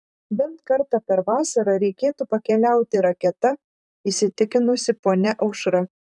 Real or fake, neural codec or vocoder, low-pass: fake; vocoder, 44.1 kHz, 128 mel bands, Pupu-Vocoder; 10.8 kHz